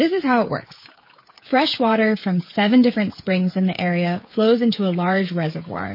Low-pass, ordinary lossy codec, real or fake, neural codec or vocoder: 5.4 kHz; MP3, 24 kbps; fake; codec, 16 kHz, 8 kbps, FreqCodec, smaller model